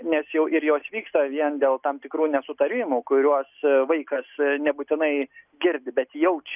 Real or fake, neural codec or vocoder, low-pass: real; none; 3.6 kHz